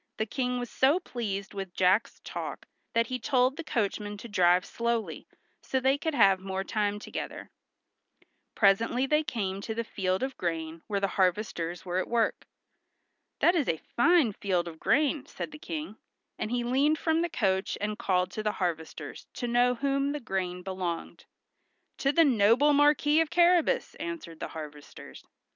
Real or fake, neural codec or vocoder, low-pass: real; none; 7.2 kHz